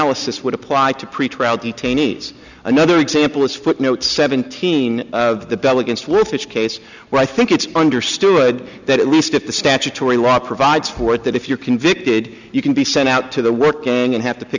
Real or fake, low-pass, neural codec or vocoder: real; 7.2 kHz; none